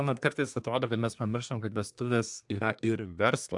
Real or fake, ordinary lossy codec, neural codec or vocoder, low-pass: fake; AAC, 64 kbps; codec, 24 kHz, 1 kbps, SNAC; 10.8 kHz